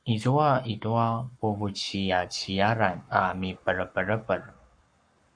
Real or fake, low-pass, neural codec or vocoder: fake; 9.9 kHz; codec, 44.1 kHz, 7.8 kbps, Pupu-Codec